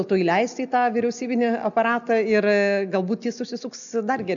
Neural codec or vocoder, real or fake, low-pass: none; real; 7.2 kHz